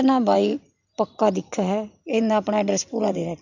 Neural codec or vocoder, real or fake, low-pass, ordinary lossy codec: vocoder, 44.1 kHz, 128 mel bands every 512 samples, BigVGAN v2; fake; 7.2 kHz; none